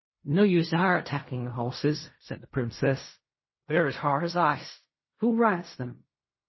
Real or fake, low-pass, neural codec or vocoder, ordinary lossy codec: fake; 7.2 kHz; codec, 16 kHz in and 24 kHz out, 0.4 kbps, LongCat-Audio-Codec, fine tuned four codebook decoder; MP3, 24 kbps